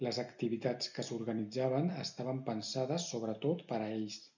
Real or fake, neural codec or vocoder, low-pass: real; none; 7.2 kHz